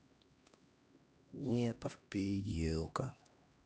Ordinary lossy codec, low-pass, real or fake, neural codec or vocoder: none; none; fake; codec, 16 kHz, 1 kbps, X-Codec, HuBERT features, trained on LibriSpeech